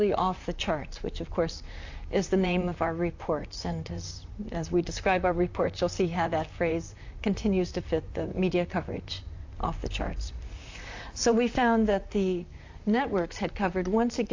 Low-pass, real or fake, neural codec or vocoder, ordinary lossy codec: 7.2 kHz; fake; vocoder, 44.1 kHz, 128 mel bands, Pupu-Vocoder; AAC, 48 kbps